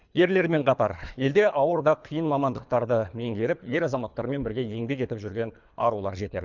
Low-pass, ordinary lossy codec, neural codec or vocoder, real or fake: 7.2 kHz; none; codec, 24 kHz, 3 kbps, HILCodec; fake